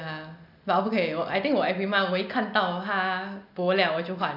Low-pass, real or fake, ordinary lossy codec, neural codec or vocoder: 5.4 kHz; real; none; none